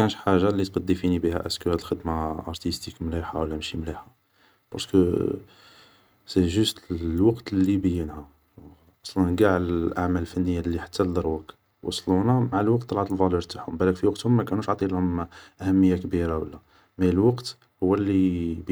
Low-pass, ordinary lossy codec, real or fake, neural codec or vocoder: none; none; real; none